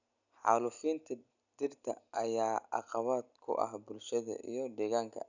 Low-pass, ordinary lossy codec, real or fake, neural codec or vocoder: 7.2 kHz; none; real; none